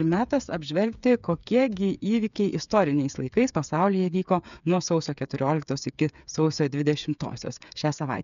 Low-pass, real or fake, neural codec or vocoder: 7.2 kHz; fake; codec, 16 kHz, 8 kbps, FreqCodec, smaller model